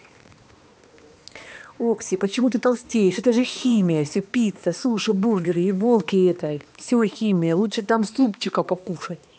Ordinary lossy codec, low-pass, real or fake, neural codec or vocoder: none; none; fake; codec, 16 kHz, 2 kbps, X-Codec, HuBERT features, trained on balanced general audio